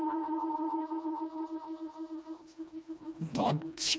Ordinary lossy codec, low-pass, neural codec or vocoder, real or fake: none; none; codec, 16 kHz, 1 kbps, FreqCodec, smaller model; fake